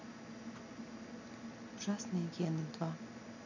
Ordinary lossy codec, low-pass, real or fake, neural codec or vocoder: none; 7.2 kHz; real; none